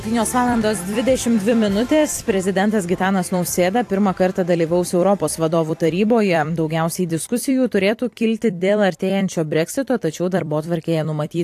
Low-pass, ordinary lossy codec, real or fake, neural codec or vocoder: 14.4 kHz; AAC, 64 kbps; fake; vocoder, 44.1 kHz, 128 mel bands every 256 samples, BigVGAN v2